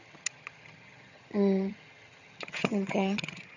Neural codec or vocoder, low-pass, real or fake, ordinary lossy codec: vocoder, 22.05 kHz, 80 mel bands, HiFi-GAN; 7.2 kHz; fake; none